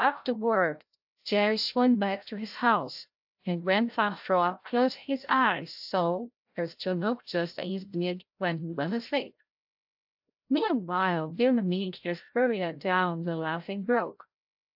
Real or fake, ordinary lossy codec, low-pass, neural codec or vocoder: fake; AAC, 48 kbps; 5.4 kHz; codec, 16 kHz, 0.5 kbps, FreqCodec, larger model